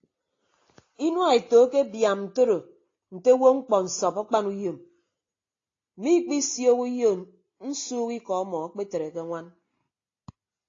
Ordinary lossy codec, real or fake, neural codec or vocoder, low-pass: AAC, 32 kbps; real; none; 7.2 kHz